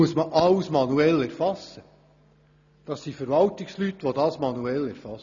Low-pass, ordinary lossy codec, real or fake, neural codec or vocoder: 7.2 kHz; none; real; none